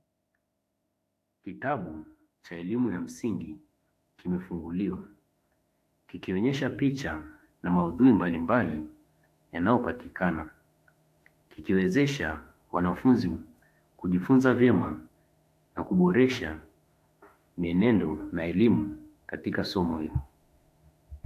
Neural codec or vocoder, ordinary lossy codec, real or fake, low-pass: autoencoder, 48 kHz, 32 numbers a frame, DAC-VAE, trained on Japanese speech; AAC, 64 kbps; fake; 14.4 kHz